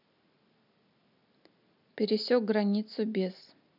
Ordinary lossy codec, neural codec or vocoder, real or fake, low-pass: none; none; real; 5.4 kHz